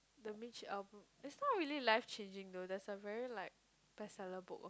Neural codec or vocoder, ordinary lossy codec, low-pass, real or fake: none; none; none; real